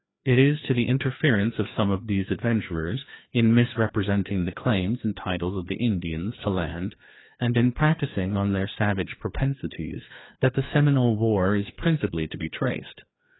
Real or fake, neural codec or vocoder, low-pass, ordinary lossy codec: fake; codec, 16 kHz, 2 kbps, FreqCodec, larger model; 7.2 kHz; AAC, 16 kbps